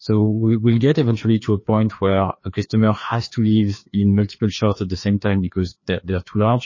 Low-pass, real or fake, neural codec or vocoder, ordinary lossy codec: 7.2 kHz; fake; codec, 16 kHz, 2 kbps, FreqCodec, larger model; MP3, 32 kbps